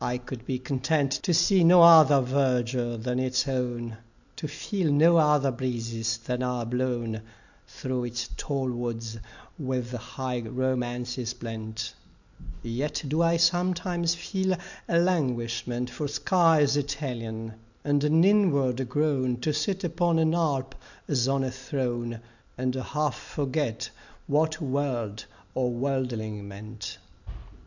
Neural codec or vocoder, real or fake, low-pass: none; real; 7.2 kHz